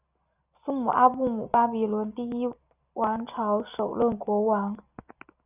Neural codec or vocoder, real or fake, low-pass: none; real; 3.6 kHz